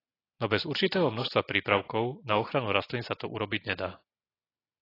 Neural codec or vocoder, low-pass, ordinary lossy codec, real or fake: none; 5.4 kHz; AAC, 24 kbps; real